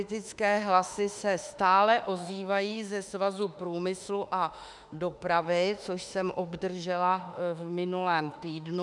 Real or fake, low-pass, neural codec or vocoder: fake; 10.8 kHz; autoencoder, 48 kHz, 32 numbers a frame, DAC-VAE, trained on Japanese speech